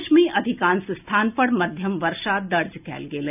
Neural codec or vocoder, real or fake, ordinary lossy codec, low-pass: none; real; none; 3.6 kHz